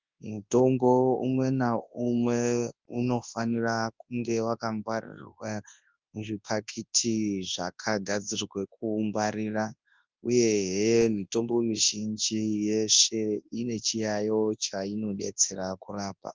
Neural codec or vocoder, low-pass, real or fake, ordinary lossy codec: codec, 24 kHz, 0.9 kbps, WavTokenizer, large speech release; 7.2 kHz; fake; Opus, 24 kbps